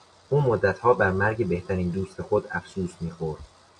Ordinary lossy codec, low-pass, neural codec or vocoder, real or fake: MP3, 64 kbps; 10.8 kHz; none; real